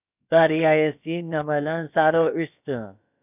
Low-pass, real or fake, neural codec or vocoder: 3.6 kHz; fake; codec, 16 kHz, about 1 kbps, DyCAST, with the encoder's durations